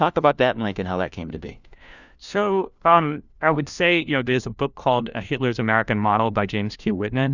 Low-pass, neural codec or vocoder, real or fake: 7.2 kHz; codec, 16 kHz, 1 kbps, FunCodec, trained on LibriTTS, 50 frames a second; fake